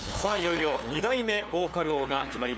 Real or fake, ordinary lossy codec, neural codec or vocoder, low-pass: fake; none; codec, 16 kHz, 2 kbps, FunCodec, trained on LibriTTS, 25 frames a second; none